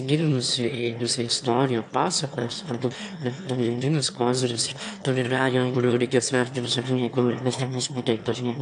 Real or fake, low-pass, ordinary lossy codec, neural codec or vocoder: fake; 9.9 kHz; AAC, 64 kbps; autoencoder, 22.05 kHz, a latent of 192 numbers a frame, VITS, trained on one speaker